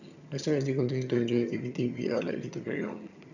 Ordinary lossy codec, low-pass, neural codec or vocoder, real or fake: none; 7.2 kHz; vocoder, 22.05 kHz, 80 mel bands, HiFi-GAN; fake